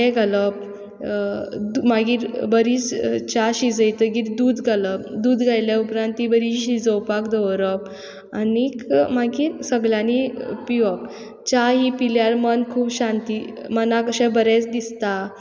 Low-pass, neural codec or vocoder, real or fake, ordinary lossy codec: none; none; real; none